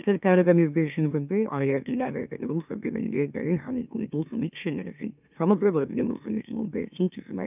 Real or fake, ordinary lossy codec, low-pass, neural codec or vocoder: fake; none; 3.6 kHz; autoencoder, 44.1 kHz, a latent of 192 numbers a frame, MeloTTS